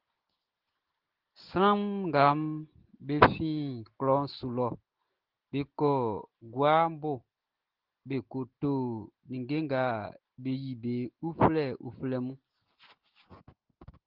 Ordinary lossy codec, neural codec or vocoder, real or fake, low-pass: Opus, 16 kbps; none; real; 5.4 kHz